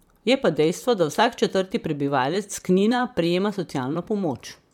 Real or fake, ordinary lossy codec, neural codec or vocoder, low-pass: fake; MP3, 96 kbps; vocoder, 44.1 kHz, 128 mel bands, Pupu-Vocoder; 19.8 kHz